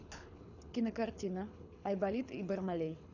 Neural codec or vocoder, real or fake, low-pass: codec, 24 kHz, 6 kbps, HILCodec; fake; 7.2 kHz